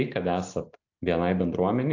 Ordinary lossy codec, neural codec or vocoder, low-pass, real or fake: AAC, 48 kbps; none; 7.2 kHz; real